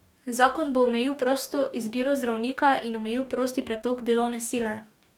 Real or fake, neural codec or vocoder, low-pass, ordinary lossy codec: fake; codec, 44.1 kHz, 2.6 kbps, DAC; 19.8 kHz; none